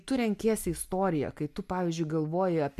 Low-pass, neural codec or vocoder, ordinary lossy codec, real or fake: 14.4 kHz; none; MP3, 96 kbps; real